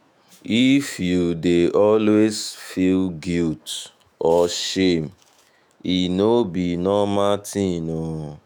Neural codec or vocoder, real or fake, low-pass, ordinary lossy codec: autoencoder, 48 kHz, 128 numbers a frame, DAC-VAE, trained on Japanese speech; fake; none; none